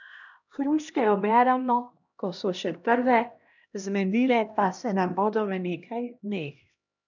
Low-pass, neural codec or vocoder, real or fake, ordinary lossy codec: 7.2 kHz; codec, 16 kHz, 1 kbps, X-Codec, HuBERT features, trained on LibriSpeech; fake; none